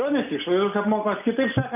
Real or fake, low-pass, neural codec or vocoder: real; 3.6 kHz; none